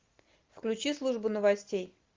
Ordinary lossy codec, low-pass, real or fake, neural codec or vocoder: Opus, 24 kbps; 7.2 kHz; real; none